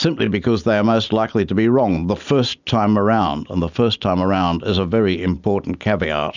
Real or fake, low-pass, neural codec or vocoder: real; 7.2 kHz; none